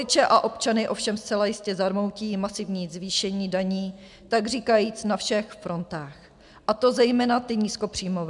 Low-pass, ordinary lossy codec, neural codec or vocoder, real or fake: 10.8 kHz; MP3, 96 kbps; none; real